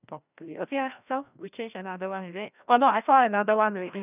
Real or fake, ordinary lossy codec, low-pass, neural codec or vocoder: fake; none; 3.6 kHz; codec, 16 kHz, 1 kbps, FreqCodec, larger model